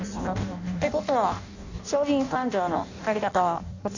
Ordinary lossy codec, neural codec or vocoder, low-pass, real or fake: none; codec, 16 kHz in and 24 kHz out, 0.6 kbps, FireRedTTS-2 codec; 7.2 kHz; fake